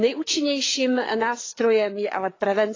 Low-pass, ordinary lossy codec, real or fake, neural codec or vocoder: 7.2 kHz; AAC, 32 kbps; fake; codec, 16 kHz, 4 kbps, FreqCodec, larger model